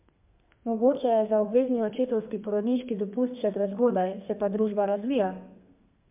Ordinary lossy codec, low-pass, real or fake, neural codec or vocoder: MP3, 32 kbps; 3.6 kHz; fake; codec, 32 kHz, 1.9 kbps, SNAC